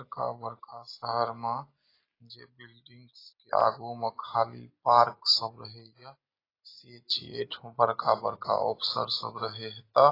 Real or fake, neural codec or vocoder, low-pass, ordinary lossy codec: real; none; 5.4 kHz; AAC, 24 kbps